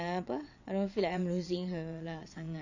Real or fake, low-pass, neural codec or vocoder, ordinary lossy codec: fake; 7.2 kHz; vocoder, 22.05 kHz, 80 mel bands, WaveNeXt; none